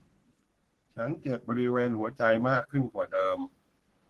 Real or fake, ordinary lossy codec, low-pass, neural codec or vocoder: fake; Opus, 16 kbps; 14.4 kHz; codec, 44.1 kHz, 3.4 kbps, Pupu-Codec